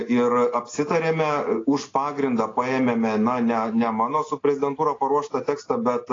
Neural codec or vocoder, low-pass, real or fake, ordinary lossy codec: none; 7.2 kHz; real; AAC, 32 kbps